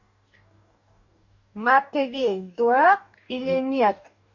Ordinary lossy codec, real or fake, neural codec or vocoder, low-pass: none; fake; codec, 44.1 kHz, 2.6 kbps, DAC; 7.2 kHz